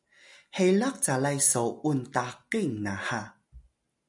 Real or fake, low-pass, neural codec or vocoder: real; 10.8 kHz; none